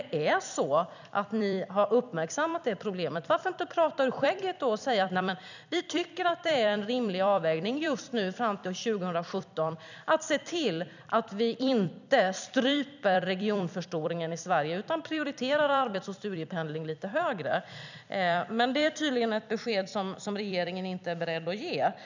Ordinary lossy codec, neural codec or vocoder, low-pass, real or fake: none; vocoder, 44.1 kHz, 128 mel bands every 256 samples, BigVGAN v2; 7.2 kHz; fake